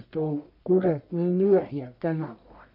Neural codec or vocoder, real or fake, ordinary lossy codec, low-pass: codec, 44.1 kHz, 1.7 kbps, Pupu-Codec; fake; none; 5.4 kHz